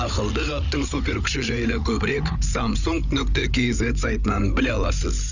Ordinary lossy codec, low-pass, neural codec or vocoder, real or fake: none; 7.2 kHz; codec, 16 kHz, 8 kbps, FreqCodec, larger model; fake